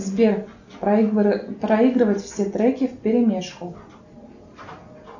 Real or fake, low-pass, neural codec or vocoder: real; 7.2 kHz; none